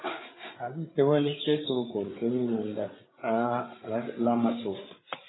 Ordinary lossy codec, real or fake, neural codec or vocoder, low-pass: AAC, 16 kbps; fake; codec, 16 kHz, 8 kbps, FreqCodec, larger model; 7.2 kHz